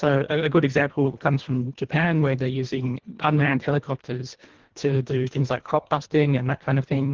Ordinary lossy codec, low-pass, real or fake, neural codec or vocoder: Opus, 16 kbps; 7.2 kHz; fake; codec, 24 kHz, 1.5 kbps, HILCodec